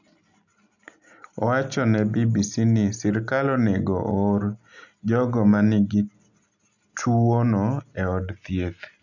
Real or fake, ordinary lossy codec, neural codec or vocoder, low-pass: real; none; none; 7.2 kHz